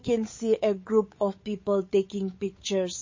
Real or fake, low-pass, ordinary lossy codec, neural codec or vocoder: fake; 7.2 kHz; MP3, 32 kbps; codec, 16 kHz, 8 kbps, FunCodec, trained on Chinese and English, 25 frames a second